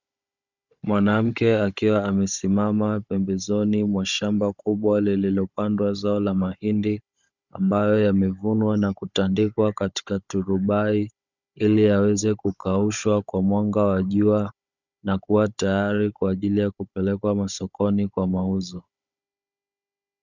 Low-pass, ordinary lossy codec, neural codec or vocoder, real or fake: 7.2 kHz; Opus, 64 kbps; codec, 16 kHz, 16 kbps, FunCodec, trained on Chinese and English, 50 frames a second; fake